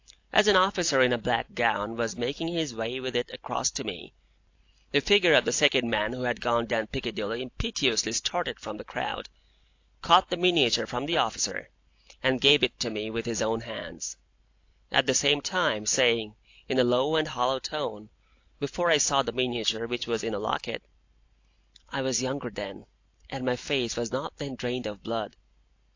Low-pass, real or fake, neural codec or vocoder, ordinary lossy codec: 7.2 kHz; real; none; AAC, 48 kbps